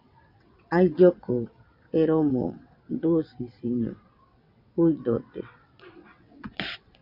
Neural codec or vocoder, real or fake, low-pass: vocoder, 22.05 kHz, 80 mel bands, WaveNeXt; fake; 5.4 kHz